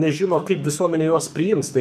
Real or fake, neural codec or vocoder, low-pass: fake; codec, 44.1 kHz, 2.6 kbps, SNAC; 14.4 kHz